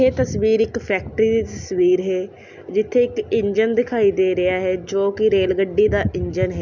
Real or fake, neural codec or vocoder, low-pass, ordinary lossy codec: real; none; 7.2 kHz; none